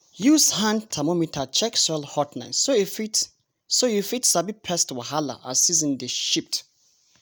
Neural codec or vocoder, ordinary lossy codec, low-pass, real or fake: none; none; none; real